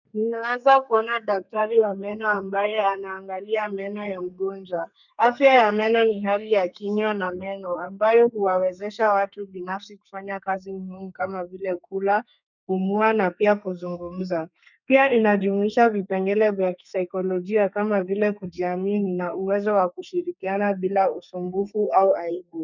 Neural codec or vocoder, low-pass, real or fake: codec, 32 kHz, 1.9 kbps, SNAC; 7.2 kHz; fake